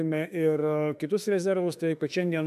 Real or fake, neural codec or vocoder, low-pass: fake; autoencoder, 48 kHz, 32 numbers a frame, DAC-VAE, trained on Japanese speech; 14.4 kHz